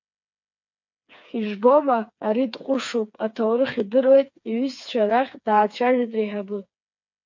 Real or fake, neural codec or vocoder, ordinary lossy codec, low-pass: fake; codec, 16 kHz, 4 kbps, FreqCodec, smaller model; MP3, 48 kbps; 7.2 kHz